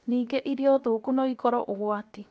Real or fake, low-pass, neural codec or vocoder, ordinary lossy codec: fake; none; codec, 16 kHz, 0.7 kbps, FocalCodec; none